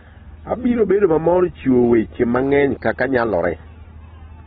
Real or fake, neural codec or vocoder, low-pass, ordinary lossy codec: real; none; 7.2 kHz; AAC, 16 kbps